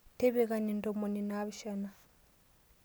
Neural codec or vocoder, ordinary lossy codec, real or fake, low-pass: none; none; real; none